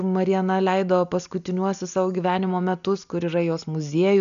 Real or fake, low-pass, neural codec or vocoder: real; 7.2 kHz; none